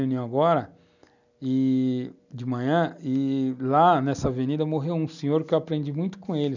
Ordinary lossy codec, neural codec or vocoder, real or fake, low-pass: none; none; real; 7.2 kHz